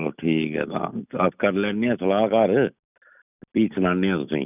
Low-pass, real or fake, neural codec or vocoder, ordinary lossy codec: 3.6 kHz; real; none; none